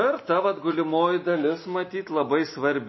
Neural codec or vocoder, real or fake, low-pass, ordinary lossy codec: none; real; 7.2 kHz; MP3, 24 kbps